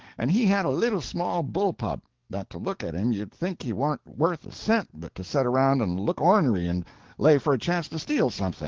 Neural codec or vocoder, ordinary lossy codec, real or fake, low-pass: codec, 44.1 kHz, 7.8 kbps, DAC; Opus, 16 kbps; fake; 7.2 kHz